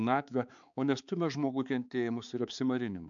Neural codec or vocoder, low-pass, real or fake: codec, 16 kHz, 4 kbps, X-Codec, HuBERT features, trained on balanced general audio; 7.2 kHz; fake